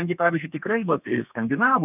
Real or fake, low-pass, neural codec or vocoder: fake; 3.6 kHz; codec, 32 kHz, 1.9 kbps, SNAC